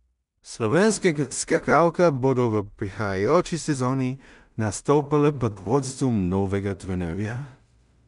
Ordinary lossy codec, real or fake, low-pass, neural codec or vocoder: none; fake; 10.8 kHz; codec, 16 kHz in and 24 kHz out, 0.4 kbps, LongCat-Audio-Codec, two codebook decoder